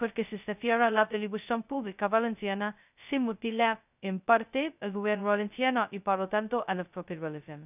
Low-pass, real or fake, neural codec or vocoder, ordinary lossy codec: 3.6 kHz; fake; codec, 16 kHz, 0.2 kbps, FocalCodec; none